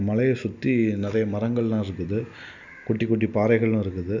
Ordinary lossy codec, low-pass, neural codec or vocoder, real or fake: none; 7.2 kHz; none; real